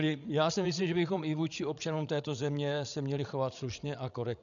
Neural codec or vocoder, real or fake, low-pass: codec, 16 kHz, 16 kbps, FreqCodec, larger model; fake; 7.2 kHz